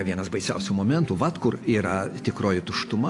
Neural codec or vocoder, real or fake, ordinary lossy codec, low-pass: none; real; AAC, 48 kbps; 10.8 kHz